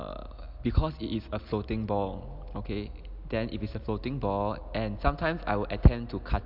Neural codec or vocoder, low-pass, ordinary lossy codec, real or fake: none; 5.4 kHz; MP3, 48 kbps; real